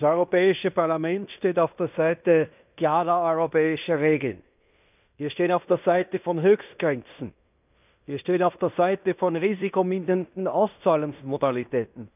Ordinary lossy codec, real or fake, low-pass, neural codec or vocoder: none; fake; 3.6 kHz; codec, 16 kHz in and 24 kHz out, 0.9 kbps, LongCat-Audio-Codec, fine tuned four codebook decoder